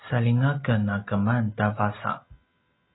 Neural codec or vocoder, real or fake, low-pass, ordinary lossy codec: none; real; 7.2 kHz; AAC, 16 kbps